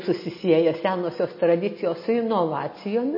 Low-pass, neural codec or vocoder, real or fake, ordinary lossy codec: 5.4 kHz; none; real; MP3, 24 kbps